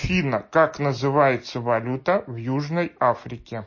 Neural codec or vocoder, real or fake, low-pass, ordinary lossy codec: none; real; 7.2 kHz; MP3, 32 kbps